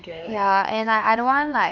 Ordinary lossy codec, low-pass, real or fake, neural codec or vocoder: none; 7.2 kHz; fake; codec, 16 kHz, 4 kbps, X-Codec, HuBERT features, trained on LibriSpeech